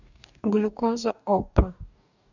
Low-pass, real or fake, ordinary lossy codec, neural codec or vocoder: 7.2 kHz; fake; none; codec, 44.1 kHz, 2.6 kbps, DAC